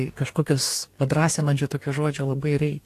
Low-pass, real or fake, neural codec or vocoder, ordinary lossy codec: 14.4 kHz; fake; codec, 44.1 kHz, 2.6 kbps, DAC; AAC, 64 kbps